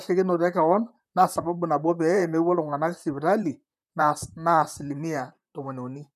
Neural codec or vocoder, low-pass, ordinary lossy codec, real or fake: vocoder, 44.1 kHz, 128 mel bands, Pupu-Vocoder; 14.4 kHz; none; fake